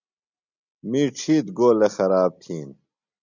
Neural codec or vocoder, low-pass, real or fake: none; 7.2 kHz; real